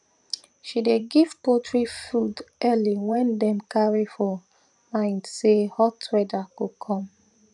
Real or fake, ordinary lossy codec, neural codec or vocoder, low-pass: fake; none; vocoder, 44.1 kHz, 128 mel bands every 512 samples, BigVGAN v2; 10.8 kHz